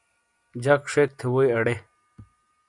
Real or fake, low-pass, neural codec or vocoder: real; 10.8 kHz; none